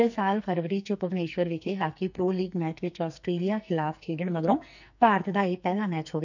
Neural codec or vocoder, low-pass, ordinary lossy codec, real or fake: codec, 44.1 kHz, 2.6 kbps, SNAC; 7.2 kHz; none; fake